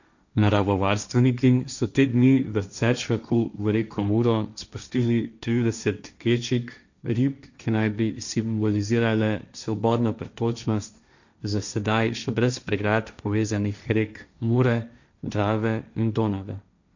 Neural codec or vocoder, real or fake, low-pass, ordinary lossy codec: codec, 16 kHz, 1.1 kbps, Voila-Tokenizer; fake; 7.2 kHz; none